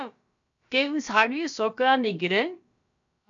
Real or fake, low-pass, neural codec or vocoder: fake; 7.2 kHz; codec, 16 kHz, about 1 kbps, DyCAST, with the encoder's durations